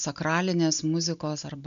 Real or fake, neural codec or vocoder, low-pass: real; none; 7.2 kHz